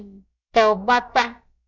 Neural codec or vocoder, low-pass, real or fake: codec, 16 kHz, about 1 kbps, DyCAST, with the encoder's durations; 7.2 kHz; fake